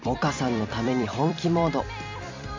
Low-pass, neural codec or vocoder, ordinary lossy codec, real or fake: 7.2 kHz; vocoder, 44.1 kHz, 128 mel bands every 256 samples, BigVGAN v2; MP3, 64 kbps; fake